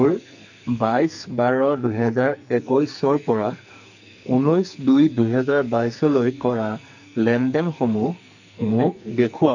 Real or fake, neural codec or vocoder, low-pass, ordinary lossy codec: fake; codec, 44.1 kHz, 2.6 kbps, SNAC; 7.2 kHz; none